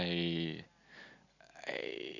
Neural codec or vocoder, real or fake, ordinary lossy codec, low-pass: none; real; none; 7.2 kHz